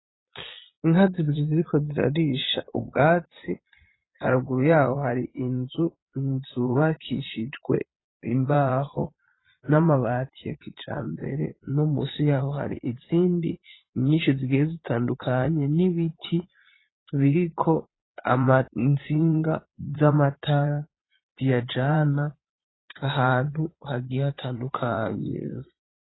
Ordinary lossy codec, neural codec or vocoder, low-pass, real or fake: AAC, 16 kbps; vocoder, 22.05 kHz, 80 mel bands, Vocos; 7.2 kHz; fake